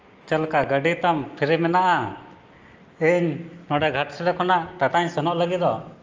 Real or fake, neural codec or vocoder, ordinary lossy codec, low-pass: real; none; Opus, 24 kbps; 7.2 kHz